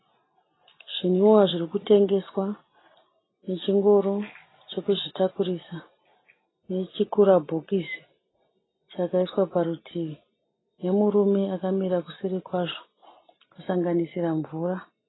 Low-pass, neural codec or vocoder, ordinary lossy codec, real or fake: 7.2 kHz; none; AAC, 16 kbps; real